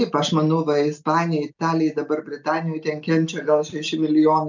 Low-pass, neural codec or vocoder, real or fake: 7.2 kHz; none; real